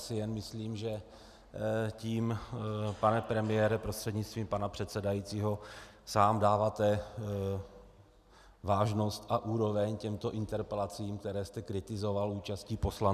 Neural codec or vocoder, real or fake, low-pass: none; real; 14.4 kHz